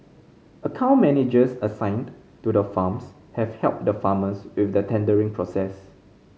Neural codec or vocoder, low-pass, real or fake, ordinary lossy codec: none; none; real; none